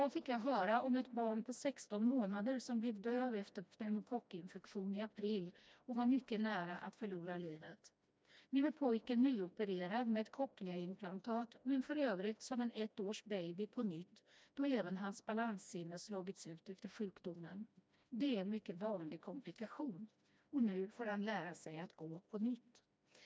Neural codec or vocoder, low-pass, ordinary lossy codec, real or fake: codec, 16 kHz, 1 kbps, FreqCodec, smaller model; none; none; fake